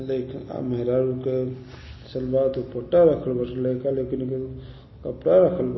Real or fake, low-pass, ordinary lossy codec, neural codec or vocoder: real; 7.2 kHz; MP3, 24 kbps; none